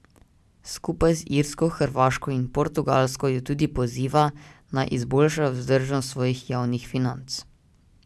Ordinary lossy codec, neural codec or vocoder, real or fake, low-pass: none; none; real; none